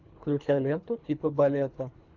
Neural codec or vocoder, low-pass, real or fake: codec, 24 kHz, 3 kbps, HILCodec; 7.2 kHz; fake